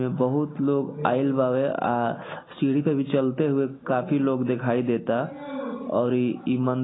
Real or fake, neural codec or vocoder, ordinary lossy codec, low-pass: real; none; AAC, 16 kbps; 7.2 kHz